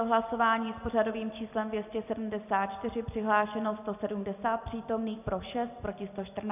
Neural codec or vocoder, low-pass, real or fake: none; 3.6 kHz; real